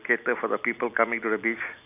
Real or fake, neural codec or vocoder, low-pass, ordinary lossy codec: real; none; 3.6 kHz; none